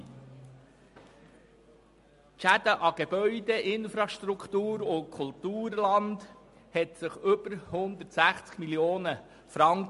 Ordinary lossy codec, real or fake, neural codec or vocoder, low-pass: MP3, 48 kbps; real; none; 14.4 kHz